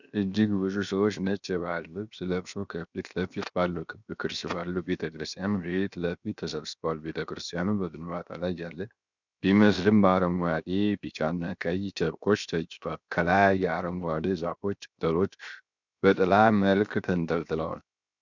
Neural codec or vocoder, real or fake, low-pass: codec, 16 kHz, 0.7 kbps, FocalCodec; fake; 7.2 kHz